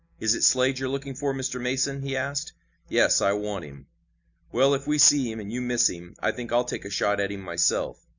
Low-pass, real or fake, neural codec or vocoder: 7.2 kHz; real; none